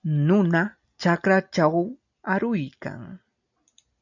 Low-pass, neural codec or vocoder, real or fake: 7.2 kHz; none; real